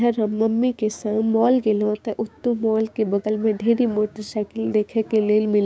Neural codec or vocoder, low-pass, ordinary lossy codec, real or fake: codec, 16 kHz, 6 kbps, DAC; none; none; fake